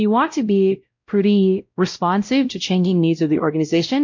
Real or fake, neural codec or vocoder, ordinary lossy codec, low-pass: fake; codec, 16 kHz, 0.5 kbps, X-Codec, WavLM features, trained on Multilingual LibriSpeech; MP3, 48 kbps; 7.2 kHz